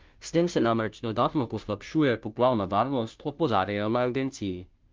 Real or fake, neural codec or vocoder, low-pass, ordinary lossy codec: fake; codec, 16 kHz, 0.5 kbps, FunCodec, trained on Chinese and English, 25 frames a second; 7.2 kHz; Opus, 24 kbps